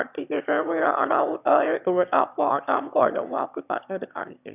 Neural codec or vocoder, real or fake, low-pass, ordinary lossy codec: autoencoder, 22.05 kHz, a latent of 192 numbers a frame, VITS, trained on one speaker; fake; 3.6 kHz; none